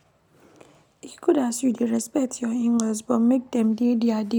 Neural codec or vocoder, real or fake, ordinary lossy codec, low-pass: none; real; none; 19.8 kHz